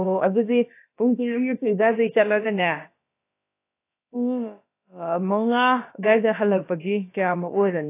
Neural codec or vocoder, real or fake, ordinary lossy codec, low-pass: codec, 16 kHz, about 1 kbps, DyCAST, with the encoder's durations; fake; AAC, 24 kbps; 3.6 kHz